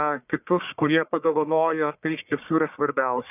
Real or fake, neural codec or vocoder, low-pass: fake; codec, 44.1 kHz, 1.7 kbps, Pupu-Codec; 3.6 kHz